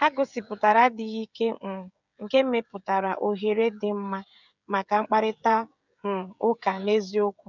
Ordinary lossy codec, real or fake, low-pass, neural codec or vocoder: none; fake; 7.2 kHz; codec, 16 kHz, 16 kbps, FreqCodec, smaller model